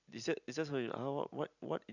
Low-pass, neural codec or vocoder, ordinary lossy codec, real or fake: 7.2 kHz; none; none; real